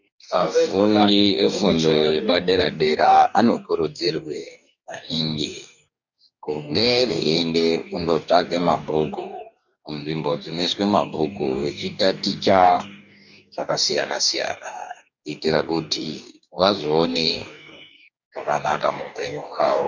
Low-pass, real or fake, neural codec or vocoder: 7.2 kHz; fake; codec, 44.1 kHz, 2.6 kbps, DAC